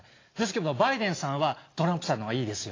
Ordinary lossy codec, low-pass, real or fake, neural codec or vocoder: AAC, 32 kbps; 7.2 kHz; real; none